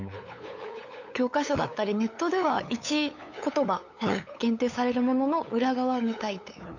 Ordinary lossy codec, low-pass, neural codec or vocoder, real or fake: none; 7.2 kHz; codec, 16 kHz, 8 kbps, FunCodec, trained on LibriTTS, 25 frames a second; fake